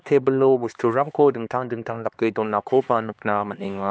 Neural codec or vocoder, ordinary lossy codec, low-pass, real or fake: codec, 16 kHz, 2 kbps, X-Codec, HuBERT features, trained on LibriSpeech; none; none; fake